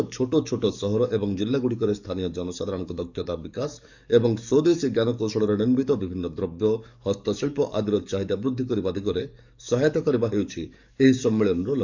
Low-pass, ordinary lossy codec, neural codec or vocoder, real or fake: 7.2 kHz; none; codec, 44.1 kHz, 7.8 kbps, DAC; fake